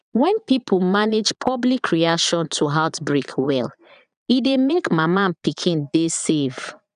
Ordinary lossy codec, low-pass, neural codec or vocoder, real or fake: none; 9.9 kHz; vocoder, 22.05 kHz, 80 mel bands, Vocos; fake